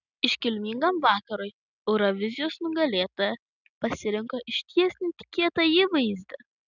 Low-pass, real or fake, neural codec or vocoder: 7.2 kHz; real; none